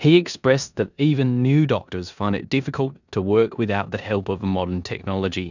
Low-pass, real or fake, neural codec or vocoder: 7.2 kHz; fake; codec, 16 kHz in and 24 kHz out, 0.9 kbps, LongCat-Audio-Codec, four codebook decoder